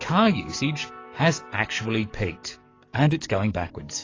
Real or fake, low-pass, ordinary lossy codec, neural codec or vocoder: fake; 7.2 kHz; AAC, 32 kbps; codec, 44.1 kHz, 7.8 kbps, DAC